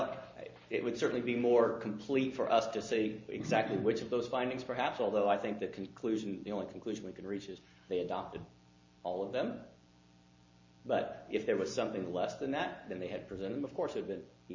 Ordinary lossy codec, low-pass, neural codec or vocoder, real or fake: MP3, 48 kbps; 7.2 kHz; none; real